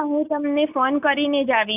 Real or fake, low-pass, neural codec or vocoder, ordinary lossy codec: real; 3.6 kHz; none; none